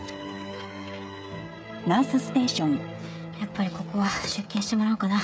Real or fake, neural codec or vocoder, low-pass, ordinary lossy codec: fake; codec, 16 kHz, 16 kbps, FreqCodec, smaller model; none; none